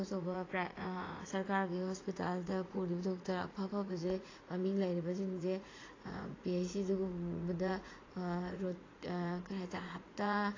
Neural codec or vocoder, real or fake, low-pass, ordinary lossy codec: vocoder, 44.1 kHz, 80 mel bands, Vocos; fake; 7.2 kHz; AAC, 32 kbps